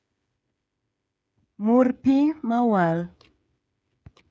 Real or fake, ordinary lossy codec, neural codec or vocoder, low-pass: fake; none; codec, 16 kHz, 8 kbps, FreqCodec, smaller model; none